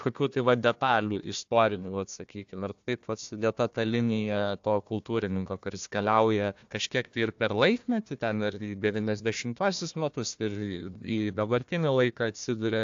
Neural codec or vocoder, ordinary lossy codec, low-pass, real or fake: codec, 16 kHz, 1 kbps, FunCodec, trained on Chinese and English, 50 frames a second; AAC, 64 kbps; 7.2 kHz; fake